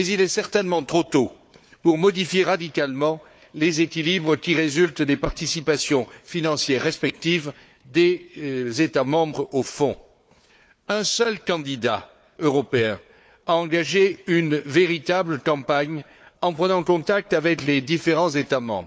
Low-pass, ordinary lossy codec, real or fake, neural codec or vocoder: none; none; fake; codec, 16 kHz, 4 kbps, FunCodec, trained on Chinese and English, 50 frames a second